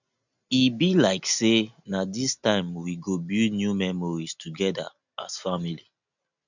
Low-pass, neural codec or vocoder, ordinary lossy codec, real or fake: 7.2 kHz; none; none; real